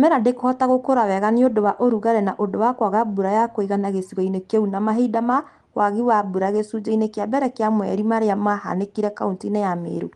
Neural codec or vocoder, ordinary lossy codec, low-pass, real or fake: none; Opus, 24 kbps; 10.8 kHz; real